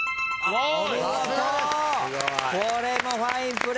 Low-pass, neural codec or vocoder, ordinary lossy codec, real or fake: none; none; none; real